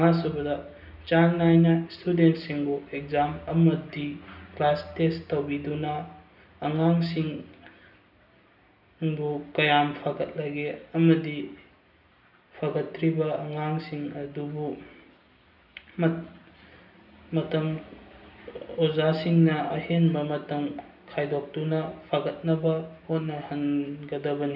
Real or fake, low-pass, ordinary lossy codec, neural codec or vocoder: real; 5.4 kHz; none; none